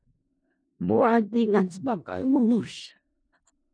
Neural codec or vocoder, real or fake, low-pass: codec, 16 kHz in and 24 kHz out, 0.4 kbps, LongCat-Audio-Codec, four codebook decoder; fake; 9.9 kHz